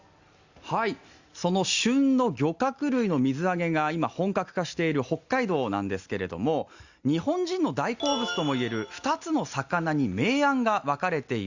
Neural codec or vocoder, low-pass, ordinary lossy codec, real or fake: none; 7.2 kHz; Opus, 64 kbps; real